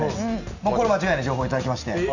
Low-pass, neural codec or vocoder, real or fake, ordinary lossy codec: 7.2 kHz; none; real; none